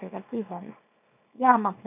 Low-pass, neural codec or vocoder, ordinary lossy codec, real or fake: 3.6 kHz; codec, 24 kHz, 0.9 kbps, WavTokenizer, small release; none; fake